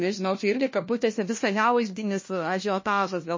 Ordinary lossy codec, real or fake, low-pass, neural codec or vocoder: MP3, 32 kbps; fake; 7.2 kHz; codec, 16 kHz, 1 kbps, FunCodec, trained on LibriTTS, 50 frames a second